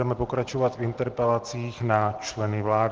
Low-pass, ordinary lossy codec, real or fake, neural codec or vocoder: 7.2 kHz; Opus, 16 kbps; real; none